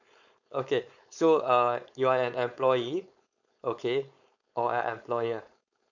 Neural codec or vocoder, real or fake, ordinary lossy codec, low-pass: codec, 16 kHz, 4.8 kbps, FACodec; fake; none; 7.2 kHz